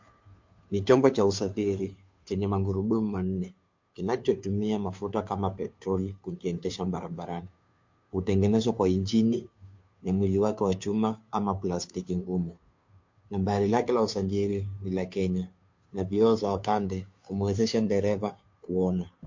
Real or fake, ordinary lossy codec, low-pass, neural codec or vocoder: fake; MP3, 48 kbps; 7.2 kHz; codec, 16 kHz, 2 kbps, FunCodec, trained on Chinese and English, 25 frames a second